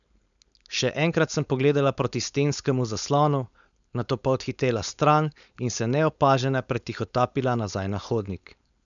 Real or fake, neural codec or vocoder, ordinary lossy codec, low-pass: fake; codec, 16 kHz, 4.8 kbps, FACodec; none; 7.2 kHz